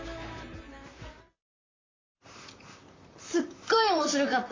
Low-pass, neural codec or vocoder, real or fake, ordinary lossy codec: 7.2 kHz; vocoder, 44.1 kHz, 128 mel bands, Pupu-Vocoder; fake; AAC, 32 kbps